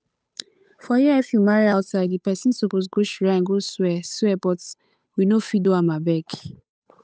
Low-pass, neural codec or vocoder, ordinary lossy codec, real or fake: none; codec, 16 kHz, 8 kbps, FunCodec, trained on Chinese and English, 25 frames a second; none; fake